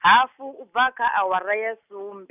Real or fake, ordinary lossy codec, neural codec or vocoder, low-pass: real; none; none; 3.6 kHz